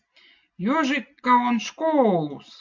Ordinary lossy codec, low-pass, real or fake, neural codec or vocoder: MP3, 48 kbps; 7.2 kHz; real; none